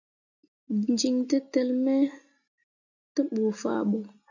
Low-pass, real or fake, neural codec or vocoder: 7.2 kHz; real; none